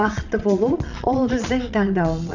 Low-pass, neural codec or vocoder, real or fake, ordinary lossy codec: 7.2 kHz; vocoder, 22.05 kHz, 80 mel bands, Vocos; fake; none